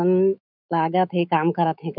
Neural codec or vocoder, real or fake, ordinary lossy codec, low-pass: autoencoder, 48 kHz, 128 numbers a frame, DAC-VAE, trained on Japanese speech; fake; none; 5.4 kHz